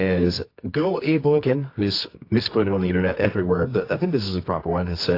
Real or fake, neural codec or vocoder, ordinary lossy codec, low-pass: fake; codec, 24 kHz, 0.9 kbps, WavTokenizer, medium music audio release; AAC, 32 kbps; 5.4 kHz